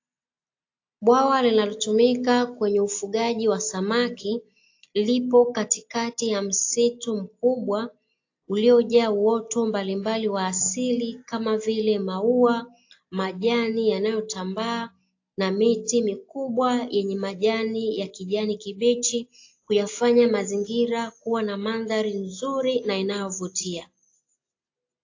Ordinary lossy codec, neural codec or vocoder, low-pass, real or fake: AAC, 48 kbps; none; 7.2 kHz; real